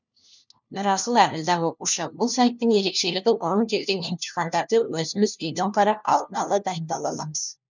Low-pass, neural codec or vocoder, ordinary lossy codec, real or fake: 7.2 kHz; codec, 16 kHz, 1 kbps, FunCodec, trained on LibriTTS, 50 frames a second; none; fake